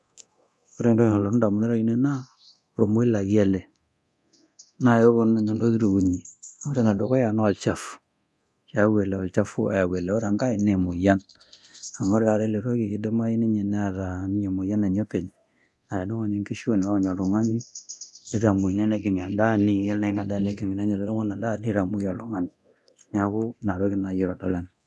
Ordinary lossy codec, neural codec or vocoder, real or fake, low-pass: none; codec, 24 kHz, 0.9 kbps, DualCodec; fake; none